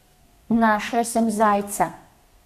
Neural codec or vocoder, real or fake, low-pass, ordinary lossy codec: codec, 32 kHz, 1.9 kbps, SNAC; fake; 14.4 kHz; MP3, 96 kbps